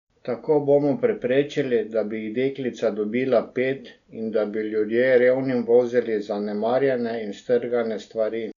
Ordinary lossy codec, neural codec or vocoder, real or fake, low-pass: none; none; real; 7.2 kHz